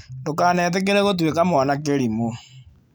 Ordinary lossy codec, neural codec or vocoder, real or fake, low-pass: none; none; real; none